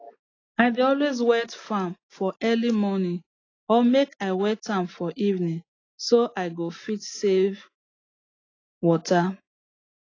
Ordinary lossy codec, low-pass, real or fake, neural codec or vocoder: AAC, 32 kbps; 7.2 kHz; real; none